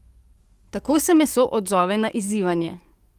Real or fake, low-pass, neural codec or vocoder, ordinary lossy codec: fake; 14.4 kHz; codec, 44.1 kHz, 7.8 kbps, DAC; Opus, 32 kbps